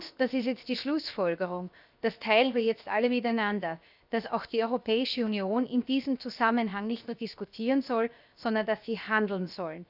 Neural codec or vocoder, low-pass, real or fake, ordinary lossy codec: codec, 16 kHz, 0.7 kbps, FocalCodec; 5.4 kHz; fake; none